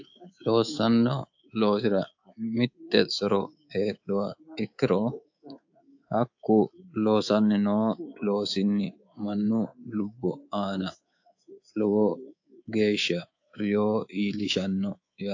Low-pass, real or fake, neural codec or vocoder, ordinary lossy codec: 7.2 kHz; fake; codec, 24 kHz, 3.1 kbps, DualCodec; AAC, 48 kbps